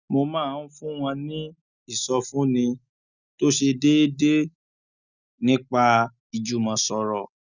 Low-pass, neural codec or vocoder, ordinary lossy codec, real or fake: 7.2 kHz; none; none; real